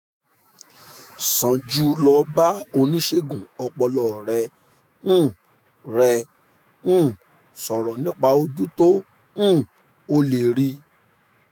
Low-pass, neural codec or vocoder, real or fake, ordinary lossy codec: none; autoencoder, 48 kHz, 128 numbers a frame, DAC-VAE, trained on Japanese speech; fake; none